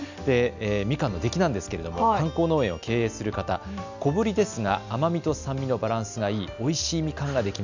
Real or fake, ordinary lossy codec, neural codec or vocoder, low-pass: real; none; none; 7.2 kHz